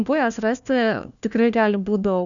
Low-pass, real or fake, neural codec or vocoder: 7.2 kHz; fake; codec, 16 kHz, 1 kbps, FunCodec, trained on LibriTTS, 50 frames a second